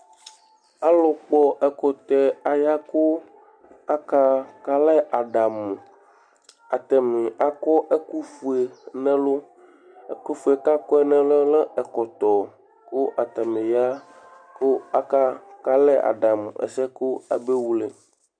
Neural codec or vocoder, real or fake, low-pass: none; real; 9.9 kHz